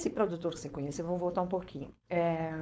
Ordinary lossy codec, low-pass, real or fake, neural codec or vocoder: none; none; fake; codec, 16 kHz, 4.8 kbps, FACodec